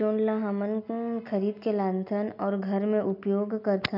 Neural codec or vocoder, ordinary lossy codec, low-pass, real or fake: none; none; 5.4 kHz; real